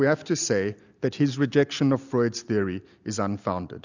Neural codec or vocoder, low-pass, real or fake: none; 7.2 kHz; real